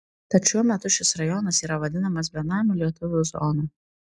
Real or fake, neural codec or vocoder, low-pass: real; none; 10.8 kHz